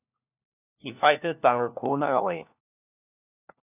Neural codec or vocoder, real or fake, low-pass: codec, 16 kHz, 1 kbps, FunCodec, trained on LibriTTS, 50 frames a second; fake; 3.6 kHz